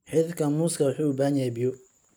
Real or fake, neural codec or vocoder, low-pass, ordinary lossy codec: real; none; none; none